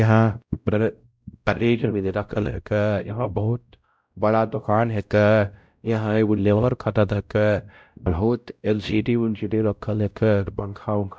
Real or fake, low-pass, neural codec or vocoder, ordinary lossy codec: fake; none; codec, 16 kHz, 0.5 kbps, X-Codec, WavLM features, trained on Multilingual LibriSpeech; none